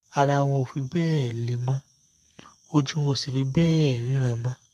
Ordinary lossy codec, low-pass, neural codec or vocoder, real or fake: none; 14.4 kHz; codec, 32 kHz, 1.9 kbps, SNAC; fake